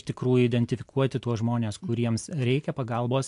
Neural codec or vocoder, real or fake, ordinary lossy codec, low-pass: none; real; AAC, 64 kbps; 10.8 kHz